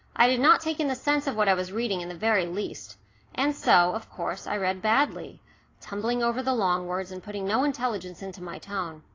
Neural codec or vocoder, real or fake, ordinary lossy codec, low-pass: none; real; AAC, 32 kbps; 7.2 kHz